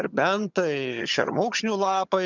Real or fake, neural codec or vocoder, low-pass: fake; vocoder, 22.05 kHz, 80 mel bands, HiFi-GAN; 7.2 kHz